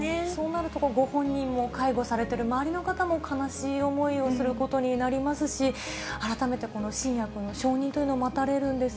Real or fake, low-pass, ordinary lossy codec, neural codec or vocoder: real; none; none; none